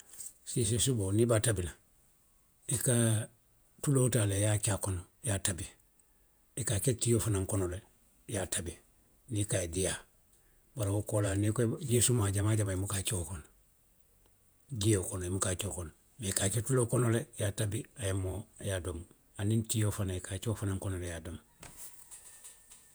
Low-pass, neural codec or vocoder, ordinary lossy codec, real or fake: none; vocoder, 48 kHz, 128 mel bands, Vocos; none; fake